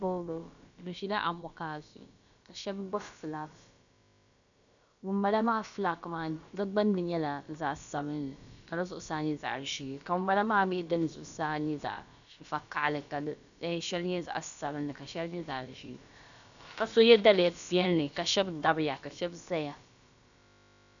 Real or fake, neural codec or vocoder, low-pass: fake; codec, 16 kHz, about 1 kbps, DyCAST, with the encoder's durations; 7.2 kHz